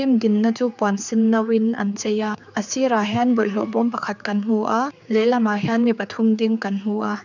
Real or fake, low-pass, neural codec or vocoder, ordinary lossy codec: fake; 7.2 kHz; codec, 16 kHz, 4 kbps, X-Codec, HuBERT features, trained on general audio; none